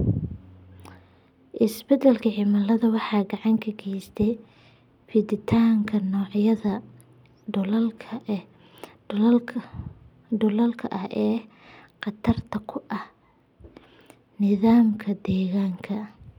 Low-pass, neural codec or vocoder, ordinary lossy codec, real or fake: 19.8 kHz; none; none; real